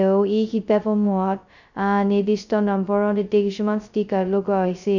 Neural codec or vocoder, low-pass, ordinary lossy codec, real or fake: codec, 16 kHz, 0.2 kbps, FocalCodec; 7.2 kHz; none; fake